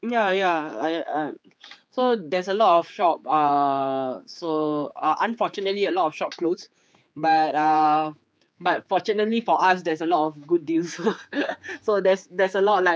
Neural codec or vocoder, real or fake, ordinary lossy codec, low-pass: codec, 16 kHz, 4 kbps, X-Codec, HuBERT features, trained on general audio; fake; none; none